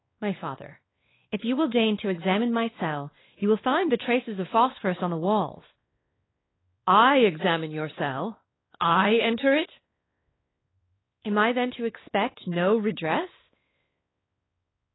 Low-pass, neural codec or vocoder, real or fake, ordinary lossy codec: 7.2 kHz; codec, 16 kHz, 2 kbps, X-Codec, WavLM features, trained on Multilingual LibriSpeech; fake; AAC, 16 kbps